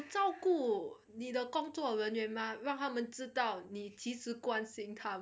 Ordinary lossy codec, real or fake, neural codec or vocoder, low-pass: none; real; none; none